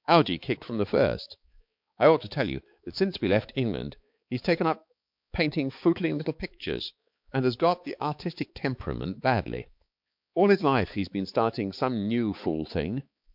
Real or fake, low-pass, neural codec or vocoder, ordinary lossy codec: fake; 5.4 kHz; codec, 16 kHz, 4 kbps, X-Codec, HuBERT features, trained on balanced general audio; MP3, 48 kbps